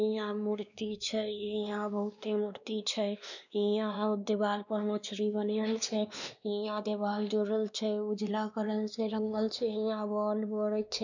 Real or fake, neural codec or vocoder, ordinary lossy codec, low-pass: fake; codec, 16 kHz, 2 kbps, X-Codec, WavLM features, trained on Multilingual LibriSpeech; none; 7.2 kHz